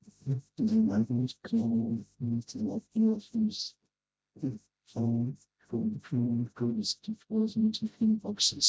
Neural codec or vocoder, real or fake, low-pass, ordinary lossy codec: codec, 16 kHz, 0.5 kbps, FreqCodec, smaller model; fake; none; none